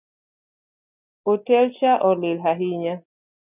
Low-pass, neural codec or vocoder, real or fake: 3.6 kHz; none; real